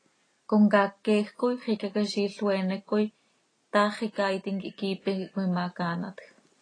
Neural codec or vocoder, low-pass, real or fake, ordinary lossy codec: none; 9.9 kHz; real; AAC, 32 kbps